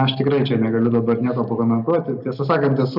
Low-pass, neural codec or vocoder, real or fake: 5.4 kHz; none; real